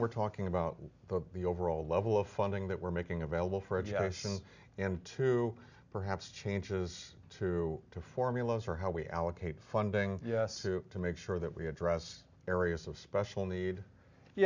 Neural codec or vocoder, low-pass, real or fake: none; 7.2 kHz; real